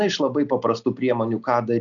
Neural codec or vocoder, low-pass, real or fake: none; 7.2 kHz; real